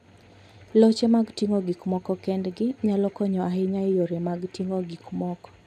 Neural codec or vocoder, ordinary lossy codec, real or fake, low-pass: none; none; real; 14.4 kHz